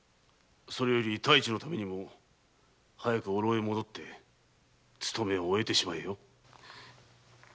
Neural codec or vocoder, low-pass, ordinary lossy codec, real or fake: none; none; none; real